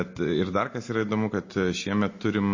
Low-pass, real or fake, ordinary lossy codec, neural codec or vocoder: 7.2 kHz; real; MP3, 32 kbps; none